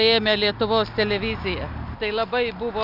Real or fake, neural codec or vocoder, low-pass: real; none; 5.4 kHz